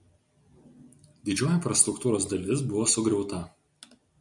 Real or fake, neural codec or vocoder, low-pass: real; none; 10.8 kHz